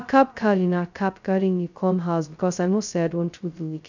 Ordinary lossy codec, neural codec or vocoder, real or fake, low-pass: none; codec, 16 kHz, 0.2 kbps, FocalCodec; fake; 7.2 kHz